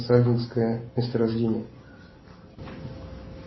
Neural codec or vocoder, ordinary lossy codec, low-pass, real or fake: none; MP3, 24 kbps; 7.2 kHz; real